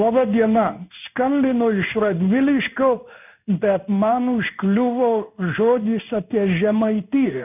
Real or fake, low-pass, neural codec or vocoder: fake; 3.6 kHz; codec, 16 kHz in and 24 kHz out, 1 kbps, XY-Tokenizer